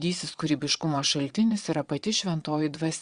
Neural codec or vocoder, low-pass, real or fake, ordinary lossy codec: vocoder, 22.05 kHz, 80 mel bands, Vocos; 9.9 kHz; fake; Opus, 64 kbps